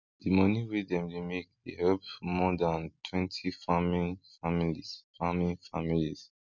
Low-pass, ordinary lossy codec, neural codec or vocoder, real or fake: 7.2 kHz; MP3, 64 kbps; none; real